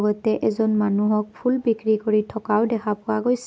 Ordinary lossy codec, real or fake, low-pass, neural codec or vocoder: none; real; none; none